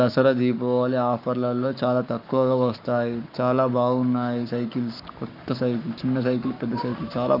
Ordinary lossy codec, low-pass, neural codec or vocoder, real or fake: none; 5.4 kHz; codec, 44.1 kHz, 7.8 kbps, Pupu-Codec; fake